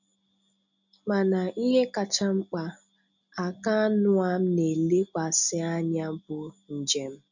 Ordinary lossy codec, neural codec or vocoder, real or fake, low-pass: none; none; real; 7.2 kHz